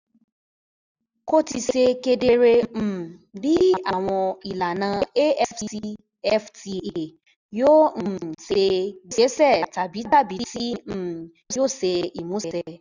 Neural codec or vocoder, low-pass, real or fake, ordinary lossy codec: none; 7.2 kHz; real; none